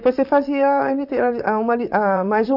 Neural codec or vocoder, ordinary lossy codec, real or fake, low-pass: vocoder, 44.1 kHz, 128 mel bands, Pupu-Vocoder; none; fake; 5.4 kHz